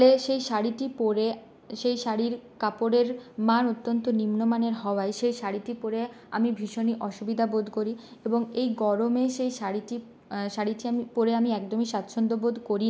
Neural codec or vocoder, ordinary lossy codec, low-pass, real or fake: none; none; none; real